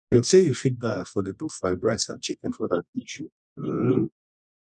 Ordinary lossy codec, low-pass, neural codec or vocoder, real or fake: none; none; codec, 24 kHz, 0.9 kbps, WavTokenizer, medium music audio release; fake